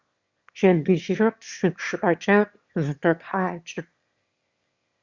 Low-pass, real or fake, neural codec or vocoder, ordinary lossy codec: 7.2 kHz; fake; autoencoder, 22.05 kHz, a latent of 192 numbers a frame, VITS, trained on one speaker; Opus, 64 kbps